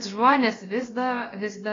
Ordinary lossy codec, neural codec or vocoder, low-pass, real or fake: AAC, 32 kbps; codec, 16 kHz, about 1 kbps, DyCAST, with the encoder's durations; 7.2 kHz; fake